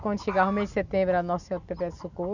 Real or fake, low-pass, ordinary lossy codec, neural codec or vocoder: real; 7.2 kHz; none; none